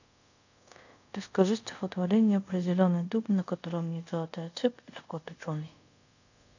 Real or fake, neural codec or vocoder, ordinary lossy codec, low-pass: fake; codec, 24 kHz, 0.5 kbps, DualCodec; none; 7.2 kHz